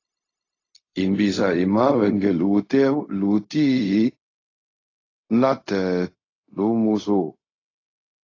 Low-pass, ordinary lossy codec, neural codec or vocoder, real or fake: 7.2 kHz; AAC, 32 kbps; codec, 16 kHz, 0.4 kbps, LongCat-Audio-Codec; fake